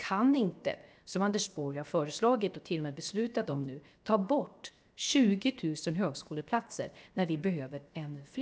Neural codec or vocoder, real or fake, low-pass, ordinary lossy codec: codec, 16 kHz, 0.7 kbps, FocalCodec; fake; none; none